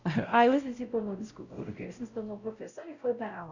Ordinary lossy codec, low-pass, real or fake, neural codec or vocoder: Opus, 64 kbps; 7.2 kHz; fake; codec, 16 kHz, 0.5 kbps, X-Codec, WavLM features, trained on Multilingual LibriSpeech